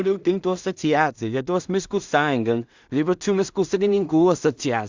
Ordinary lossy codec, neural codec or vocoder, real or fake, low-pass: Opus, 64 kbps; codec, 16 kHz in and 24 kHz out, 0.4 kbps, LongCat-Audio-Codec, two codebook decoder; fake; 7.2 kHz